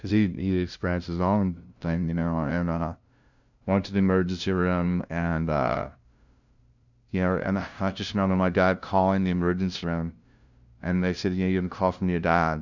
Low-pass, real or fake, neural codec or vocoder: 7.2 kHz; fake; codec, 16 kHz, 0.5 kbps, FunCodec, trained on LibriTTS, 25 frames a second